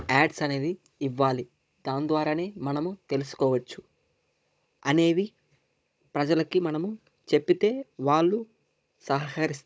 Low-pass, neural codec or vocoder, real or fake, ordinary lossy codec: none; codec, 16 kHz, 16 kbps, FunCodec, trained on Chinese and English, 50 frames a second; fake; none